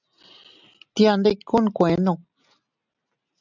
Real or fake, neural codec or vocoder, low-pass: real; none; 7.2 kHz